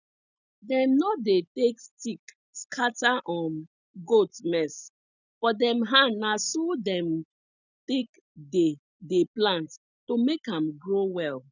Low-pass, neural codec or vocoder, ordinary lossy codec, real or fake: 7.2 kHz; none; none; real